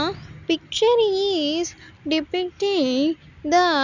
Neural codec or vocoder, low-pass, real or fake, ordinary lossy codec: none; 7.2 kHz; real; none